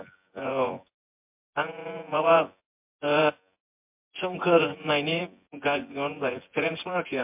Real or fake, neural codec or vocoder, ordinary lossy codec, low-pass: fake; vocoder, 24 kHz, 100 mel bands, Vocos; MP3, 32 kbps; 3.6 kHz